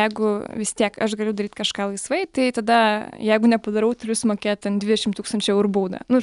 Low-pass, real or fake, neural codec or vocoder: 10.8 kHz; real; none